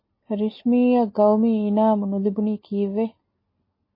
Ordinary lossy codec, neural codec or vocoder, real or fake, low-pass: MP3, 24 kbps; none; real; 5.4 kHz